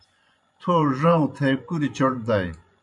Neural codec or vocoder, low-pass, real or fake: vocoder, 24 kHz, 100 mel bands, Vocos; 10.8 kHz; fake